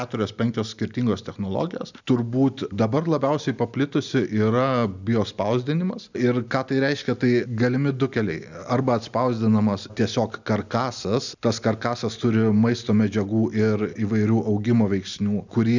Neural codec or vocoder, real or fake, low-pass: none; real; 7.2 kHz